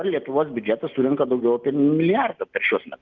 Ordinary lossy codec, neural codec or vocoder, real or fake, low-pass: Opus, 24 kbps; none; real; 7.2 kHz